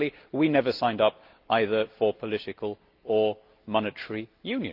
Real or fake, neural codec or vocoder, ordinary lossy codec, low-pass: real; none; Opus, 32 kbps; 5.4 kHz